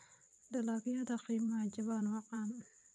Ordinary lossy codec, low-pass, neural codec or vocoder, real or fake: none; none; vocoder, 22.05 kHz, 80 mel bands, WaveNeXt; fake